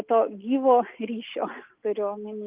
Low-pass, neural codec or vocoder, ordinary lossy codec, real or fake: 3.6 kHz; none; Opus, 24 kbps; real